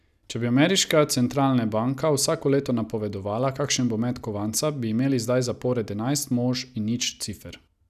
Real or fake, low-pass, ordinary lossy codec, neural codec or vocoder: real; 14.4 kHz; none; none